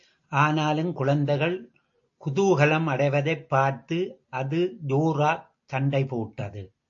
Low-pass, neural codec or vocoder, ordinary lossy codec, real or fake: 7.2 kHz; none; AAC, 48 kbps; real